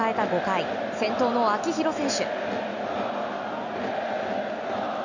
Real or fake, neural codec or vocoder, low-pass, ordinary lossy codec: real; none; 7.2 kHz; none